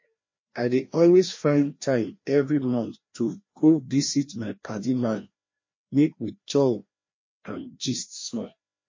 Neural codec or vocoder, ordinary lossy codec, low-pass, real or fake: codec, 16 kHz, 1 kbps, FreqCodec, larger model; MP3, 32 kbps; 7.2 kHz; fake